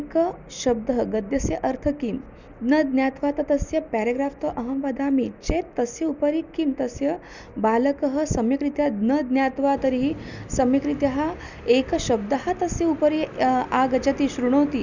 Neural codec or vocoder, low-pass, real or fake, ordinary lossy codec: none; 7.2 kHz; real; none